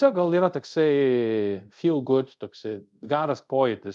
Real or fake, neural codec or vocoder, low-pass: fake; codec, 24 kHz, 0.5 kbps, DualCodec; 10.8 kHz